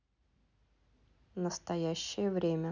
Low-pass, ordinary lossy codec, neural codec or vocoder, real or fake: 7.2 kHz; none; none; real